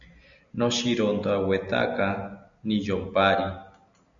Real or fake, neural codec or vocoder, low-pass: real; none; 7.2 kHz